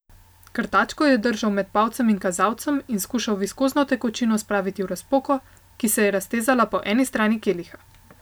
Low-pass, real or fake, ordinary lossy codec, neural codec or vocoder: none; real; none; none